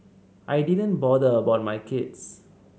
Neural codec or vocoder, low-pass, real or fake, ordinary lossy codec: none; none; real; none